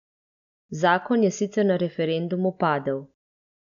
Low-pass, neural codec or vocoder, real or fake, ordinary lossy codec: 7.2 kHz; none; real; MP3, 96 kbps